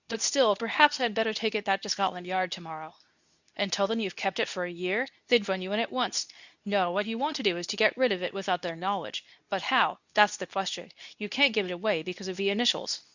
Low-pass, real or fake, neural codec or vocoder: 7.2 kHz; fake; codec, 24 kHz, 0.9 kbps, WavTokenizer, medium speech release version 2